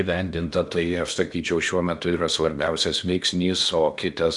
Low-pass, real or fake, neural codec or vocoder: 10.8 kHz; fake; codec, 16 kHz in and 24 kHz out, 0.8 kbps, FocalCodec, streaming, 65536 codes